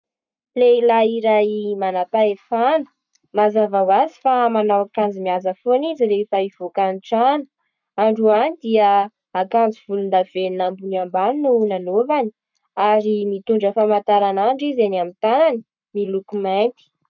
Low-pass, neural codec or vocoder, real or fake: 7.2 kHz; codec, 44.1 kHz, 7.8 kbps, Pupu-Codec; fake